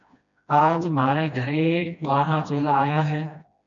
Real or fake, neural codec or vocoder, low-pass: fake; codec, 16 kHz, 1 kbps, FreqCodec, smaller model; 7.2 kHz